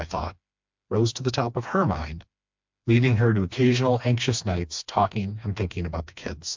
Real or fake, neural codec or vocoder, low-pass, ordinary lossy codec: fake; codec, 16 kHz, 2 kbps, FreqCodec, smaller model; 7.2 kHz; MP3, 64 kbps